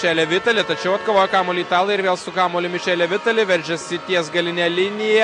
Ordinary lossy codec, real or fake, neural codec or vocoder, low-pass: MP3, 48 kbps; real; none; 9.9 kHz